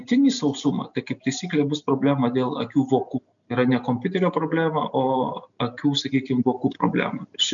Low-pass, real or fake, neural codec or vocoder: 7.2 kHz; real; none